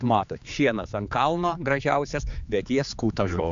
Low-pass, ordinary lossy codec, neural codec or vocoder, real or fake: 7.2 kHz; MP3, 64 kbps; codec, 16 kHz, 4 kbps, X-Codec, HuBERT features, trained on general audio; fake